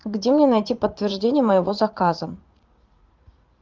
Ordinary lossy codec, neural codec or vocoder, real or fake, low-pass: Opus, 24 kbps; vocoder, 44.1 kHz, 80 mel bands, Vocos; fake; 7.2 kHz